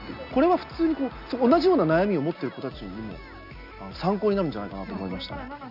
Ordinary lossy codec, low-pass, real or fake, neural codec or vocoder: none; 5.4 kHz; real; none